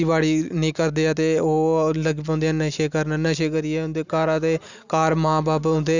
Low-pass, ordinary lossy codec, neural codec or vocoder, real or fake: 7.2 kHz; none; none; real